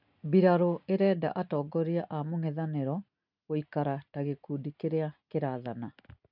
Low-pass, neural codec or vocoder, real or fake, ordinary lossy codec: 5.4 kHz; none; real; none